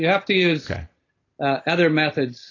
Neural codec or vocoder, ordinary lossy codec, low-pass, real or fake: none; AAC, 32 kbps; 7.2 kHz; real